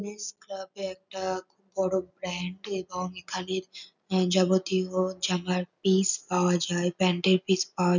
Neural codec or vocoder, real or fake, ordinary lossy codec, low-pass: none; real; none; 7.2 kHz